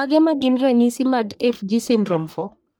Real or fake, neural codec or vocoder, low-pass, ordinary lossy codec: fake; codec, 44.1 kHz, 1.7 kbps, Pupu-Codec; none; none